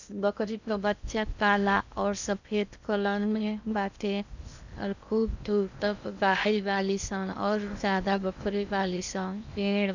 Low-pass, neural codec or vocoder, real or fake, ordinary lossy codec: 7.2 kHz; codec, 16 kHz in and 24 kHz out, 0.6 kbps, FocalCodec, streaming, 2048 codes; fake; none